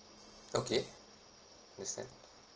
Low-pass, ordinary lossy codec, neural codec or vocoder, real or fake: 7.2 kHz; Opus, 16 kbps; none; real